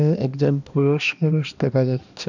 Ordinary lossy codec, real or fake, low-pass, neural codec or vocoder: none; fake; 7.2 kHz; codec, 16 kHz, 1 kbps, X-Codec, HuBERT features, trained on balanced general audio